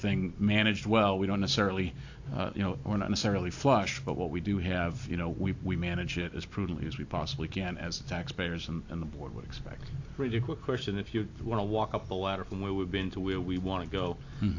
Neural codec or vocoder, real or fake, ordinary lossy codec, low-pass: none; real; AAC, 48 kbps; 7.2 kHz